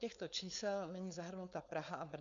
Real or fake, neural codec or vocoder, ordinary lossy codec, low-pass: fake; codec, 16 kHz, 4.8 kbps, FACodec; AAC, 64 kbps; 7.2 kHz